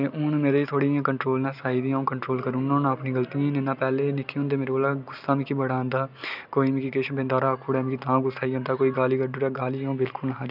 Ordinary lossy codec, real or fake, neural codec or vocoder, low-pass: none; real; none; 5.4 kHz